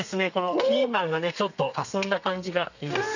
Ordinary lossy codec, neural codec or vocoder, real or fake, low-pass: none; codec, 32 kHz, 1.9 kbps, SNAC; fake; 7.2 kHz